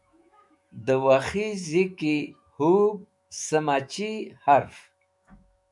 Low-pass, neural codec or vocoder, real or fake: 10.8 kHz; autoencoder, 48 kHz, 128 numbers a frame, DAC-VAE, trained on Japanese speech; fake